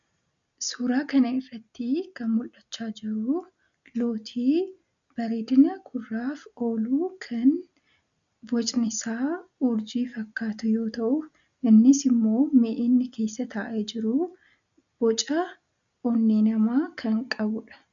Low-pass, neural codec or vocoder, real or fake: 7.2 kHz; none; real